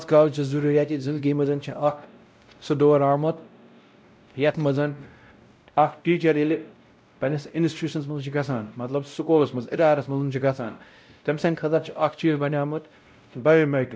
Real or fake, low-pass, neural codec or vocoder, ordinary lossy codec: fake; none; codec, 16 kHz, 0.5 kbps, X-Codec, WavLM features, trained on Multilingual LibriSpeech; none